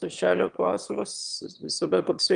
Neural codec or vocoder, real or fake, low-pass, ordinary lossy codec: autoencoder, 22.05 kHz, a latent of 192 numbers a frame, VITS, trained on one speaker; fake; 9.9 kHz; Opus, 32 kbps